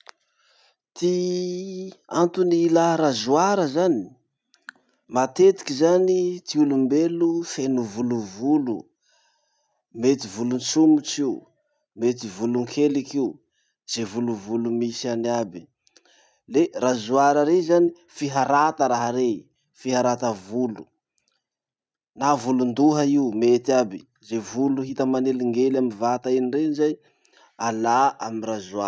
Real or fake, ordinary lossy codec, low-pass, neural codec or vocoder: real; none; none; none